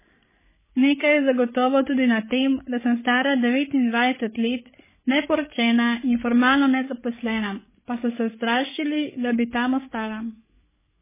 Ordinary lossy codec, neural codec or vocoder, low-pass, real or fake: MP3, 16 kbps; codec, 16 kHz, 8 kbps, FreqCodec, larger model; 3.6 kHz; fake